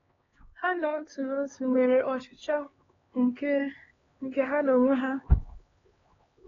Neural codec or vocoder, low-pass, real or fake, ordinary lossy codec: codec, 16 kHz, 2 kbps, X-Codec, HuBERT features, trained on LibriSpeech; 7.2 kHz; fake; AAC, 24 kbps